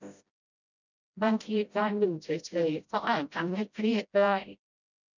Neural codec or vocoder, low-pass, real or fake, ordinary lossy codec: codec, 16 kHz, 0.5 kbps, FreqCodec, smaller model; 7.2 kHz; fake; none